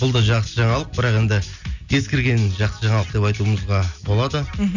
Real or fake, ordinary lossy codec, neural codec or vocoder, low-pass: real; none; none; 7.2 kHz